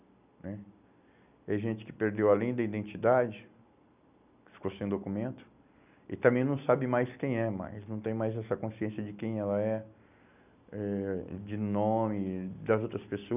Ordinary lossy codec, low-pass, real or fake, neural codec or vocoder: none; 3.6 kHz; real; none